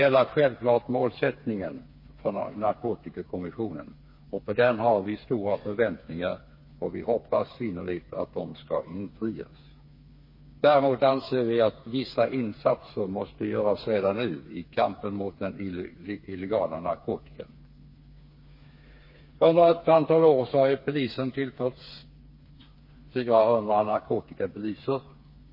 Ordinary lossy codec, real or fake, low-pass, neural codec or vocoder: MP3, 24 kbps; fake; 5.4 kHz; codec, 16 kHz, 4 kbps, FreqCodec, smaller model